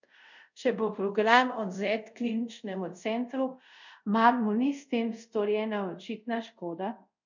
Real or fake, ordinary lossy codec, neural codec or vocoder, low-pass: fake; none; codec, 24 kHz, 0.5 kbps, DualCodec; 7.2 kHz